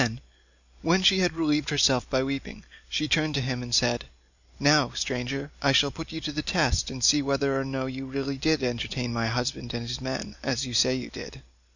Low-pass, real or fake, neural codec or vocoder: 7.2 kHz; real; none